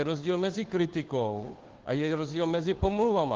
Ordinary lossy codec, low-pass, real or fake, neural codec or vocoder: Opus, 16 kbps; 7.2 kHz; fake; codec, 16 kHz, 2 kbps, FunCodec, trained on Chinese and English, 25 frames a second